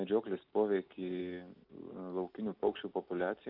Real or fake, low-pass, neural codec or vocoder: real; 5.4 kHz; none